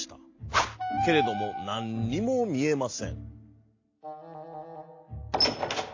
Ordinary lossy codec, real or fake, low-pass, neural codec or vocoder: AAC, 48 kbps; real; 7.2 kHz; none